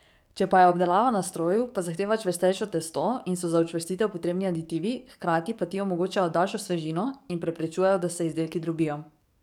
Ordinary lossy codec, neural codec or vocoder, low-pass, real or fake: none; codec, 44.1 kHz, 7.8 kbps, DAC; 19.8 kHz; fake